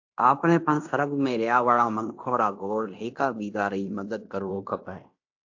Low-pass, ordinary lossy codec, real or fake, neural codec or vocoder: 7.2 kHz; AAC, 48 kbps; fake; codec, 16 kHz in and 24 kHz out, 0.9 kbps, LongCat-Audio-Codec, fine tuned four codebook decoder